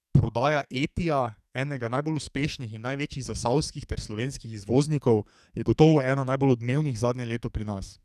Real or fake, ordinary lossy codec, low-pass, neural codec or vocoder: fake; none; 14.4 kHz; codec, 44.1 kHz, 2.6 kbps, SNAC